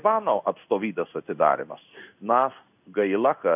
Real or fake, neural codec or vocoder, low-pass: fake; codec, 16 kHz in and 24 kHz out, 1 kbps, XY-Tokenizer; 3.6 kHz